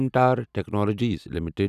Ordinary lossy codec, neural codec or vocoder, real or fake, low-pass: none; none; real; 14.4 kHz